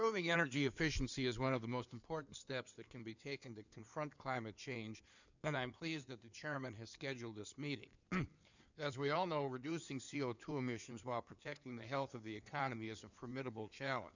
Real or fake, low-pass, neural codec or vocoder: fake; 7.2 kHz; codec, 16 kHz in and 24 kHz out, 2.2 kbps, FireRedTTS-2 codec